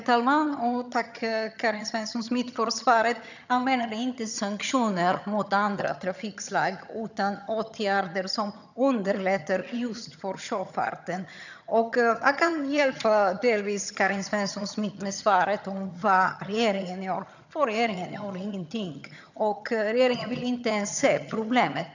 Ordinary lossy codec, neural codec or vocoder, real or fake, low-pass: none; vocoder, 22.05 kHz, 80 mel bands, HiFi-GAN; fake; 7.2 kHz